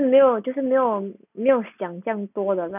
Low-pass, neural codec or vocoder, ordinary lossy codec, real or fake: 3.6 kHz; none; none; real